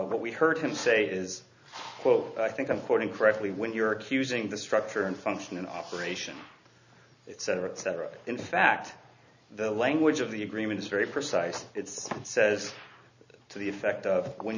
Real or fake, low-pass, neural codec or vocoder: real; 7.2 kHz; none